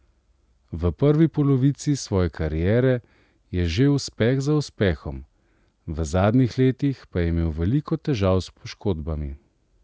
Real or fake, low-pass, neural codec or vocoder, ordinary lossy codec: real; none; none; none